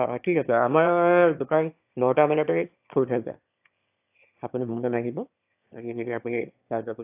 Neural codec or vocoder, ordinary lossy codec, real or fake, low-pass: autoencoder, 22.05 kHz, a latent of 192 numbers a frame, VITS, trained on one speaker; AAC, 32 kbps; fake; 3.6 kHz